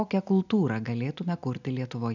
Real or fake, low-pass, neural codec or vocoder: real; 7.2 kHz; none